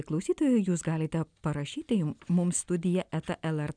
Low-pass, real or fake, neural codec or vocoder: 9.9 kHz; real; none